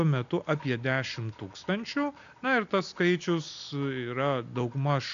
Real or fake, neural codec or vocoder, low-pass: real; none; 7.2 kHz